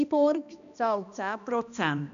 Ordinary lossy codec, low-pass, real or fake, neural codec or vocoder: none; 7.2 kHz; fake; codec, 16 kHz, 1 kbps, X-Codec, HuBERT features, trained on balanced general audio